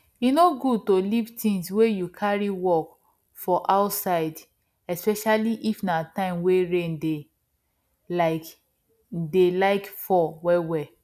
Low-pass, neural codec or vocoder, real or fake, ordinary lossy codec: 14.4 kHz; none; real; none